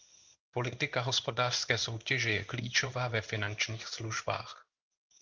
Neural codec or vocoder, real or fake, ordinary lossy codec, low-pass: codec, 16 kHz in and 24 kHz out, 1 kbps, XY-Tokenizer; fake; Opus, 32 kbps; 7.2 kHz